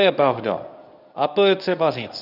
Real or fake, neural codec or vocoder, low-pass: fake; codec, 24 kHz, 0.9 kbps, WavTokenizer, medium speech release version 1; 5.4 kHz